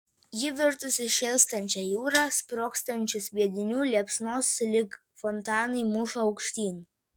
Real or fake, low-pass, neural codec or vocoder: fake; 19.8 kHz; codec, 44.1 kHz, 7.8 kbps, DAC